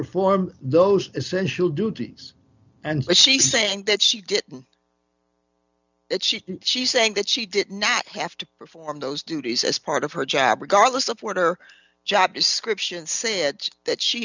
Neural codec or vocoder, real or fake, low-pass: none; real; 7.2 kHz